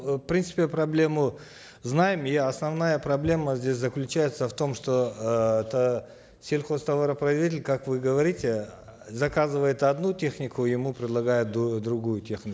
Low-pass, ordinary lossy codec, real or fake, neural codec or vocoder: none; none; real; none